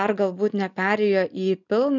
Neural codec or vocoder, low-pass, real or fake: none; 7.2 kHz; real